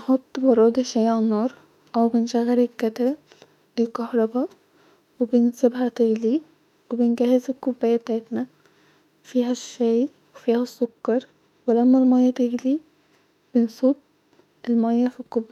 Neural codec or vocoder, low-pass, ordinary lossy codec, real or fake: autoencoder, 48 kHz, 32 numbers a frame, DAC-VAE, trained on Japanese speech; 19.8 kHz; none; fake